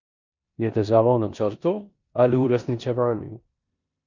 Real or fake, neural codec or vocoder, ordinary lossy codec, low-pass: fake; codec, 16 kHz in and 24 kHz out, 0.9 kbps, LongCat-Audio-Codec, four codebook decoder; none; 7.2 kHz